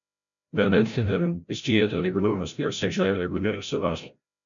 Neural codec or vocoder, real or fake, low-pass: codec, 16 kHz, 0.5 kbps, FreqCodec, larger model; fake; 7.2 kHz